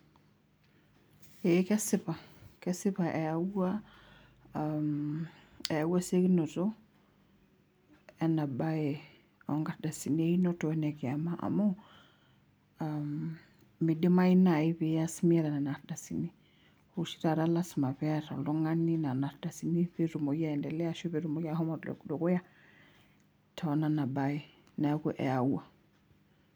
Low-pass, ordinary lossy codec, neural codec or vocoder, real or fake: none; none; none; real